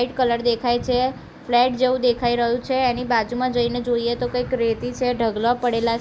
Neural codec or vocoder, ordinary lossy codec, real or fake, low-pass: none; none; real; none